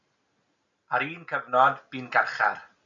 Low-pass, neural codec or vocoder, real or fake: 7.2 kHz; none; real